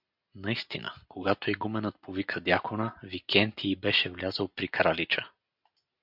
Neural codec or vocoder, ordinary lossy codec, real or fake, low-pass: none; MP3, 48 kbps; real; 5.4 kHz